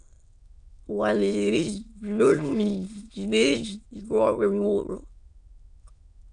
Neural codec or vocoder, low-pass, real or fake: autoencoder, 22.05 kHz, a latent of 192 numbers a frame, VITS, trained on many speakers; 9.9 kHz; fake